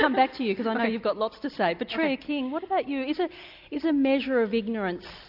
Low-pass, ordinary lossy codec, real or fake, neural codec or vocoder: 5.4 kHz; AAC, 48 kbps; real; none